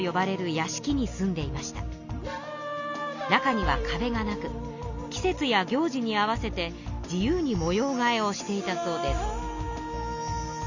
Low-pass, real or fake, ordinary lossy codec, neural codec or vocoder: 7.2 kHz; real; none; none